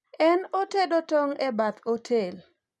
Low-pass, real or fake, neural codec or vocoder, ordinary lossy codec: none; real; none; none